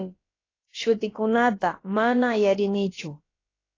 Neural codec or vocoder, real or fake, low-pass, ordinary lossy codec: codec, 16 kHz, about 1 kbps, DyCAST, with the encoder's durations; fake; 7.2 kHz; AAC, 32 kbps